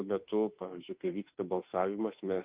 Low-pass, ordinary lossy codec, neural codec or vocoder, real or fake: 3.6 kHz; Opus, 32 kbps; autoencoder, 48 kHz, 32 numbers a frame, DAC-VAE, trained on Japanese speech; fake